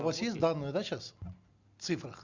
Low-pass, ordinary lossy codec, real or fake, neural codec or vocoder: 7.2 kHz; Opus, 64 kbps; real; none